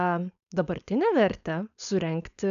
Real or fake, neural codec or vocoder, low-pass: fake; codec, 16 kHz, 4.8 kbps, FACodec; 7.2 kHz